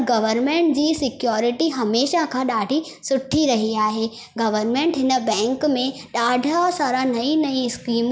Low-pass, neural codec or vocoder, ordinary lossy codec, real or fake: none; none; none; real